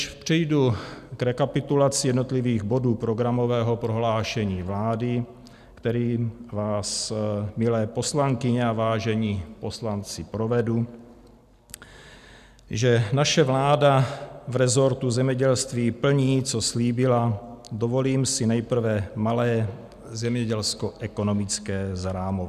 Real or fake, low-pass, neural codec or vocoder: real; 14.4 kHz; none